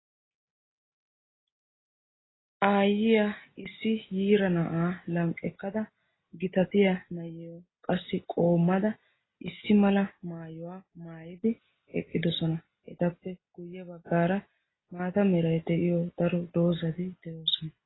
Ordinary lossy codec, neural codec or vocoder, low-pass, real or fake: AAC, 16 kbps; none; 7.2 kHz; real